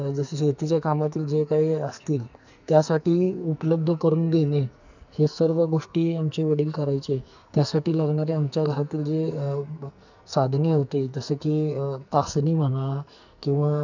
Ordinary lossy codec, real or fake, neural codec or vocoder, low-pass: none; fake; codec, 44.1 kHz, 2.6 kbps, SNAC; 7.2 kHz